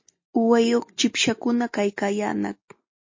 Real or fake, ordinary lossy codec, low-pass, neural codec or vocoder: real; MP3, 32 kbps; 7.2 kHz; none